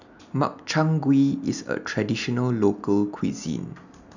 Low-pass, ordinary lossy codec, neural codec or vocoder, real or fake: 7.2 kHz; none; none; real